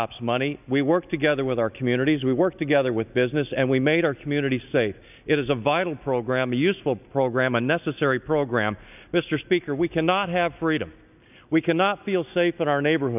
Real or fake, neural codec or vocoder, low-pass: real; none; 3.6 kHz